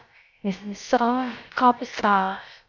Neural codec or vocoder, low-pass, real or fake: codec, 16 kHz, about 1 kbps, DyCAST, with the encoder's durations; 7.2 kHz; fake